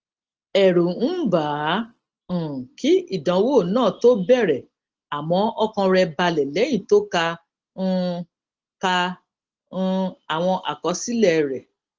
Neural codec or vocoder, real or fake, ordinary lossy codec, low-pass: none; real; Opus, 16 kbps; 7.2 kHz